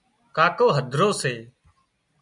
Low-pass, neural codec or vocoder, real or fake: 10.8 kHz; none; real